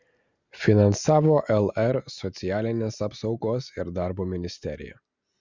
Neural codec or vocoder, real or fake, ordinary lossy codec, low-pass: none; real; Opus, 64 kbps; 7.2 kHz